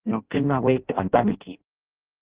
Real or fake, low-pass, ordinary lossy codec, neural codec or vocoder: fake; 3.6 kHz; Opus, 16 kbps; codec, 16 kHz in and 24 kHz out, 0.6 kbps, FireRedTTS-2 codec